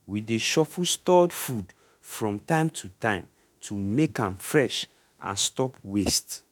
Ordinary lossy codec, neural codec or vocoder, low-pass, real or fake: none; autoencoder, 48 kHz, 32 numbers a frame, DAC-VAE, trained on Japanese speech; none; fake